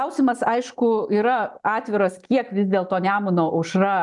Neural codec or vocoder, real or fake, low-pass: none; real; 10.8 kHz